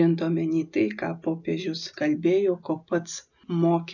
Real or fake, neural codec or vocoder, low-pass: real; none; 7.2 kHz